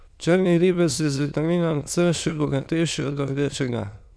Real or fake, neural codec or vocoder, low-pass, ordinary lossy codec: fake; autoencoder, 22.05 kHz, a latent of 192 numbers a frame, VITS, trained on many speakers; none; none